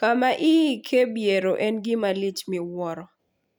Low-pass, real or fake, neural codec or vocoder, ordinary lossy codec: 19.8 kHz; real; none; none